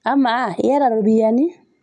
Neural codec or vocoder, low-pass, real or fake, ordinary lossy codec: none; 10.8 kHz; real; MP3, 96 kbps